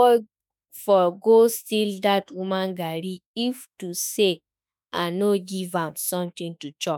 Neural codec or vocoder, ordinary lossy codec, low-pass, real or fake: autoencoder, 48 kHz, 32 numbers a frame, DAC-VAE, trained on Japanese speech; none; 19.8 kHz; fake